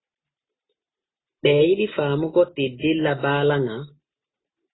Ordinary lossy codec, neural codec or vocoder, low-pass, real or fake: AAC, 16 kbps; none; 7.2 kHz; real